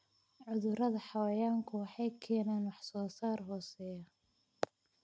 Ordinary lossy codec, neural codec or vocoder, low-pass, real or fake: none; none; none; real